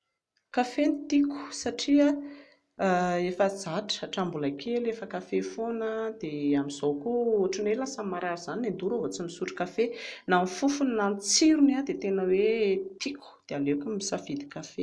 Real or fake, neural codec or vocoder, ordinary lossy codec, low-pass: real; none; none; none